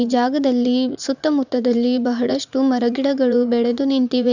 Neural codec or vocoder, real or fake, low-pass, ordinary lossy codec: vocoder, 44.1 kHz, 80 mel bands, Vocos; fake; 7.2 kHz; none